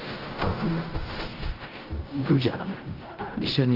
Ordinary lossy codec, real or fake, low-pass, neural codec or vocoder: Opus, 32 kbps; fake; 5.4 kHz; codec, 16 kHz in and 24 kHz out, 0.4 kbps, LongCat-Audio-Codec, fine tuned four codebook decoder